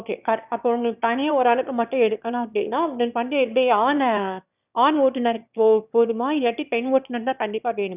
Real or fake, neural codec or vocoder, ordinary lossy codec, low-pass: fake; autoencoder, 22.05 kHz, a latent of 192 numbers a frame, VITS, trained on one speaker; none; 3.6 kHz